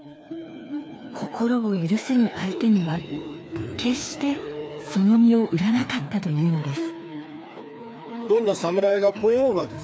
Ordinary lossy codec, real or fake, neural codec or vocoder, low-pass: none; fake; codec, 16 kHz, 2 kbps, FreqCodec, larger model; none